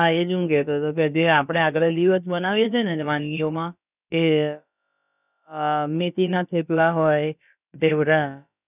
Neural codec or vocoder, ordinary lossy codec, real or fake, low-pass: codec, 16 kHz, about 1 kbps, DyCAST, with the encoder's durations; none; fake; 3.6 kHz